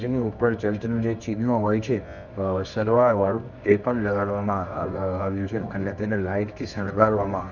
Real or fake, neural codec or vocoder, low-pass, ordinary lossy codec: fake; codec, 24 kHz, 0.9 kbps, WavTokenizer, medium music audio release; 7.2 kHz; none